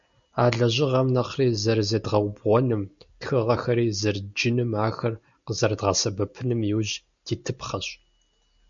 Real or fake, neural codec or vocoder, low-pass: real; none; 7.2 kHz